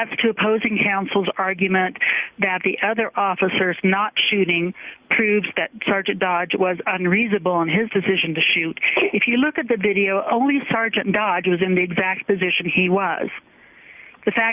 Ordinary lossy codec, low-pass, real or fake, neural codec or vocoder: Opus, 64 kbps; 3.6 kHz; real; none